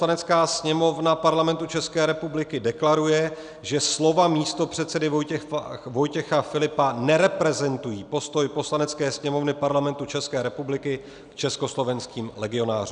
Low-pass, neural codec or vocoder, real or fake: 9.9 kHz; none; real